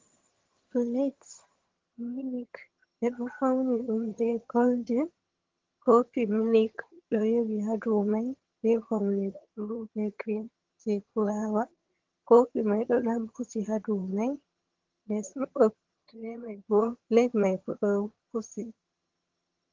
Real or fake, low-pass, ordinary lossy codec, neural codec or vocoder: fake; 7.2 kHz; Opus, 16 kbps; vocoder, 22.05 kHz, 80 mel bands, HiFi-GAN